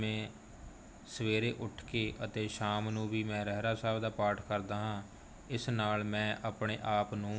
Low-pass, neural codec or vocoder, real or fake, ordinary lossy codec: none; none; real; none